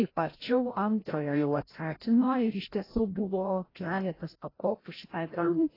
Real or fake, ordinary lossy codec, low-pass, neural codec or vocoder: fake; AAC, 24 kbps; 5.4 kHz; codec, 16 kHz, 0.5 kbps, FreqCodec, larger model